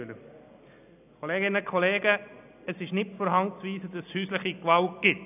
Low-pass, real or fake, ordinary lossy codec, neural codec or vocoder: 3.6 kHz; real; none; none